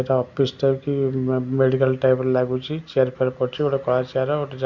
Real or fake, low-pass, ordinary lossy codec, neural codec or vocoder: real; 7.2 kHz; Opus, 64 kbps; none